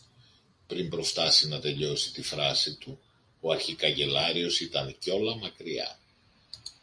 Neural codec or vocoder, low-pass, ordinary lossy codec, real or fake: none; 9.9 kHz; MP3, 48 kbps; real